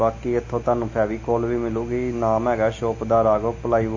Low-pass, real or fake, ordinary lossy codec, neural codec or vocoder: 7.2 kHz; real; MP3, 32 kbps; none